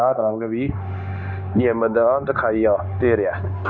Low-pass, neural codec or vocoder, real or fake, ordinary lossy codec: 7.2 kHz; codec, 16 kHz, 0.9 kbps, LongCat-Audio-Codec; fake; none